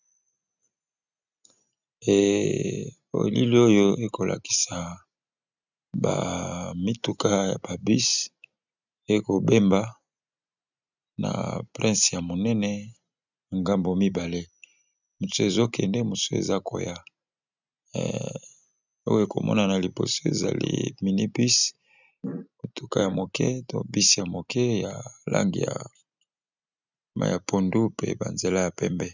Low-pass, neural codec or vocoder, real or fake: 7.2 kHz; none; real